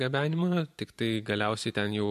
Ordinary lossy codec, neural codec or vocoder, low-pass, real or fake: MP3, 64 kbps; vocoder, 44.1 kHz, 128 mel bands every 256 samples, BigVGAN v2; 14.4 kHz; fake